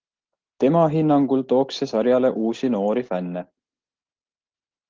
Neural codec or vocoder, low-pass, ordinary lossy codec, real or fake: none; 7.2 kHz; Opus, 16 kbps; real